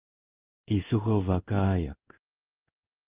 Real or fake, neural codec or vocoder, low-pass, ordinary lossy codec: fake; codec, 16 kHz in and 24 kHz out, 1 kbps, XY-Tokenizer; 3.6 kHz; Opus, 32 kbps